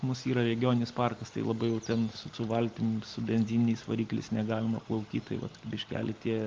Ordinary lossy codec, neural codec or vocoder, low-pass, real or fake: Opus, 32 kbps; none; 7.2 kHz; real